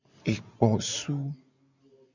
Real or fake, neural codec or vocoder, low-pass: real; none; 7.2 kHz